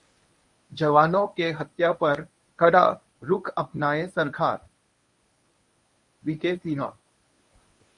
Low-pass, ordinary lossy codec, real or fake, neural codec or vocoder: 10.8 kHz; MP3, 96 kbps; fake; codec, 24 kHz, 0.9 kbps, WavTokenizer, medium speech release version 1